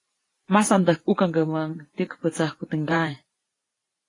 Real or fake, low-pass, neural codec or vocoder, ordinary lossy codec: fake; 10.8 kHz; vocoder, 44.1 kHz, 128 mel bands every 512 samples, BigVGAN v2; AAC, 32 kbps